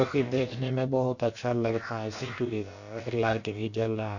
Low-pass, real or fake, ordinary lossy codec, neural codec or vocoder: 7.2 kHz; fake; none; codec, 16 kHz, about 1 kbps, DyCAST, with the encoder's durations